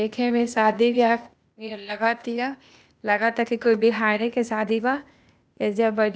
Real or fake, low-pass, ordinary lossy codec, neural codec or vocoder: fake; none; none; codec, 16 kHz, 0.8 kbps, ZipCodec